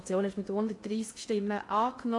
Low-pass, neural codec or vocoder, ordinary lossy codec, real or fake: 10.8 kHz; codec, 16 kHz in and 24 kHz out, 0.8 kbps, FocalCodec, streaming, 65536 codes; AAC, 48 kbps; fake